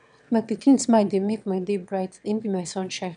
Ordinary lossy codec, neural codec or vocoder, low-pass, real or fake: none; autoencoder, 22.05 kHz, a latent of 192 numbers a frame, VITS, trained on one speaker; 9.9 kHz; fake